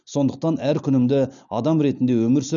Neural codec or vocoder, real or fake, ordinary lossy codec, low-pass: none; real; none; 7.2 kHz